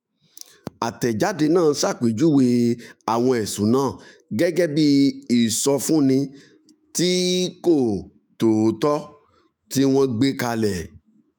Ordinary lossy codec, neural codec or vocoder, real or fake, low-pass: none; autoencoder, 48 kHz, 128 numbers a frame, DAC-VAE, trained on Japanese speech; fake; none